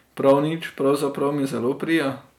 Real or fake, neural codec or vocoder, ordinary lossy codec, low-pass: real; none; none; 19.8 kHz